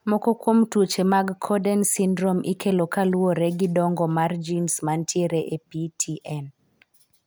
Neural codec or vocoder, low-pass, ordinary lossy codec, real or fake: none; none; none; real